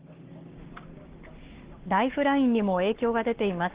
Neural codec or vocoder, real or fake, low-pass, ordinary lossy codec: codec, 44.1 kHz, 7.8 kbps, Pupu-Codec; fake; 3.6 kHz; Opus, 16 kbps